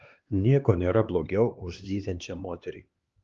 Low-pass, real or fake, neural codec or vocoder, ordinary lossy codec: 7.2 kHz; fake; codec, 16 kHz, 2 kbps, X-Codec, HuBERT features, trained on LibriSpeech; Opus, 32 kbps